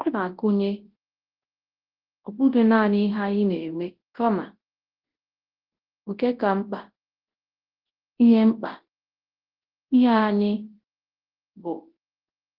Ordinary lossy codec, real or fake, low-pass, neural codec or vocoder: Opus, 16 kbps; fake; 5.4 kHz; codec, 24 kHz, 0.9 kbps, WavTokenizer, large speech release